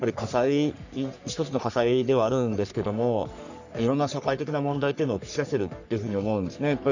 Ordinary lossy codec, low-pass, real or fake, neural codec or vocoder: none; 7.2 kHz; fake; codec, 44.1 kHz, 3.4 kbps, Pupu-Codec